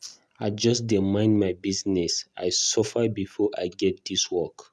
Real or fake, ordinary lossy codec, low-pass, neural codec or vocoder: real; none; none; none